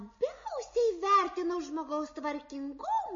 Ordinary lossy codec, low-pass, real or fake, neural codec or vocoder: MP3, 32 kbps; 7.2 kHz; real; none